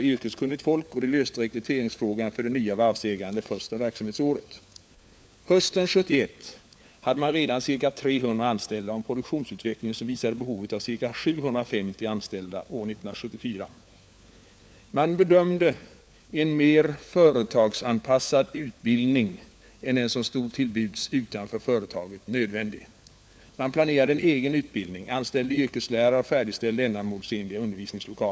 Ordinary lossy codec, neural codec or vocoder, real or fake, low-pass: none; codec, 16 kHz, 4 kbps, FunCodec, trained on LibriTTS, 50 frames a second; fake; none